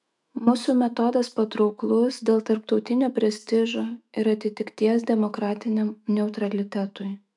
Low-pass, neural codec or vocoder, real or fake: 10.8 kHz; autoencoder, 48 kHz, 128 numbers a frame, DAC-VAE, trained on Japanese speech; fake